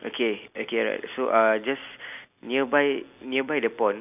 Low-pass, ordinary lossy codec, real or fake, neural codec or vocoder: 3.6 kHz; none; real; none